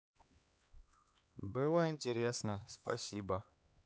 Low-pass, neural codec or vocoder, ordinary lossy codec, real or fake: none; codec, 16 kHz, 2 kbps, X-Codec, HuBERT features, trained on LibriSpeech; none; fake